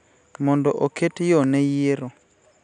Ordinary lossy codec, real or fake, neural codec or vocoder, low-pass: none; real; none; 9.9 kHz